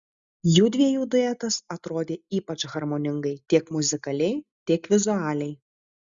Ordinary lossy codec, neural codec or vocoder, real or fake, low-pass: Opus, 64 kbps; none; real; 7.2 kHz